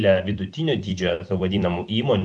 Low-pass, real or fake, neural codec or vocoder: 10.8 kHz; fake; vocoder, 44.1 kHz, 128 mel bands every 256 samples, BigVGAN v2